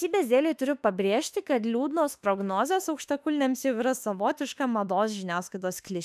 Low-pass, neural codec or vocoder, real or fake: 14.4 kHz; autoencoder, 48 kHz, 32 numbers a frame, DAC-VAE, trained on Japanese speech; fake